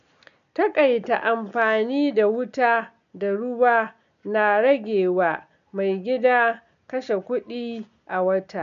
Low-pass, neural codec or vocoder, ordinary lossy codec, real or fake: 7.2 kHz; none; none; real